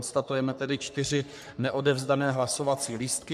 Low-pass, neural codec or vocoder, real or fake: 14.4 kHz; codec, 44.1 kHz, 3.4 kbps, Pupu-Codec; fake